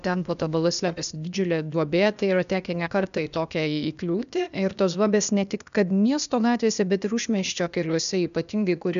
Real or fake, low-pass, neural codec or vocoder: fake; 7.2 kHz; codec, 16 kHz, 0.8 kbps, ZipCodec